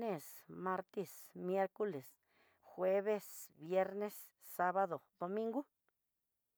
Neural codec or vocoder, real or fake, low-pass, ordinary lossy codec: none; real; none; none